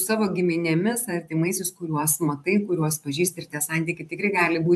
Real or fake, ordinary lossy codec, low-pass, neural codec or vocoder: fake; AAC, 96 kbps; 14.4 kHz; vocoder, 44.1 kHz, 128 mel bands every 512 samples, BigVGAN v2